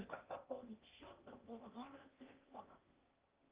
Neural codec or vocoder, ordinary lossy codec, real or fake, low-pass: codec, 16 kHz in and 24 kHz out, 0.6 kbps, FocalCodec, streaming, 2048 codes; Opus, 32 kbps; fake; 3.6 kHz